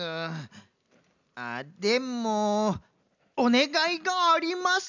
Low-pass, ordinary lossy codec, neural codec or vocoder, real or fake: 7.2 kHz; none; none; real